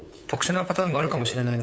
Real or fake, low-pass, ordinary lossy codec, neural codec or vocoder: fake; none; none; codec, 16 kHz, 8 kbps, FunCodec, trained on LibriTTS, 25 frames a second